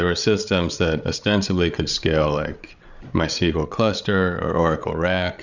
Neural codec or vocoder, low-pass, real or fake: codec, 16 kHz, 8 kbps, FreqCodec, larger model; 7.2 kHz; fake